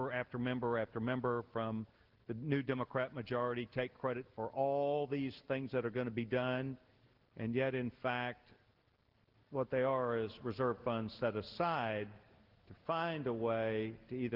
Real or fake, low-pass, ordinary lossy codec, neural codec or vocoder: real; 5.4 kHz; Opus, 16 kbps; none